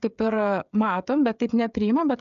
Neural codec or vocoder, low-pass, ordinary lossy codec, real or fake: codec, 16 kHz, 16 kbps, FreqCodec, smaller model; 7.2 kHz; AAC, 96 kbps; fake